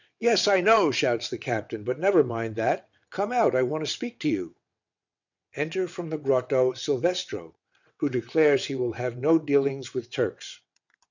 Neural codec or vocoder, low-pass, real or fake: vocoder, 22.05 kHz, 80 mel bands, WaveNeXt; 7.2 kHz; fake